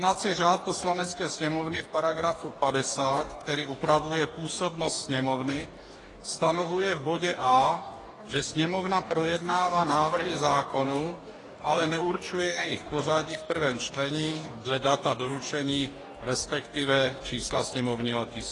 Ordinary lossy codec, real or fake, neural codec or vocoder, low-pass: AAC, 32 kbps; fake; codec, 44.1 kHz, 2.6 kbps, DAC; 10.8 kHz